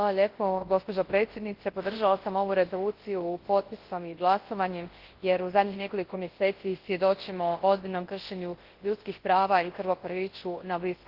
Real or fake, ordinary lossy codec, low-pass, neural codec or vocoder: fake; Opus, 16 kbps; 5.4 kHz; codec, 24 kHz, 0.9 kbps, WavTokenizer, large speech release